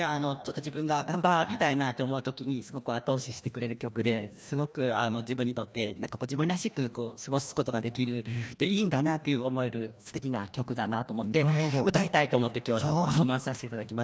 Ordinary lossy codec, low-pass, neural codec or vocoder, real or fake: none; none; codec, 16 kHz, 1 kbps, FreqCodec, larger model; fake